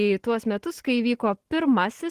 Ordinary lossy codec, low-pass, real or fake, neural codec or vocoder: Opus, 16 kbps; 14.4 kHz; real; none